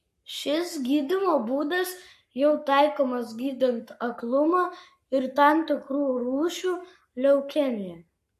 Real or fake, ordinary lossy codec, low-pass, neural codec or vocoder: fake; MP3, 64 kbps; 14.4 kHz; codec, 44.1 kHz, 7.8 kbps, Pupu-Codec